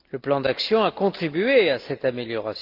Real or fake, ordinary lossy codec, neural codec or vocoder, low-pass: real; Opus, 24 kbps; none; 5.4 kHz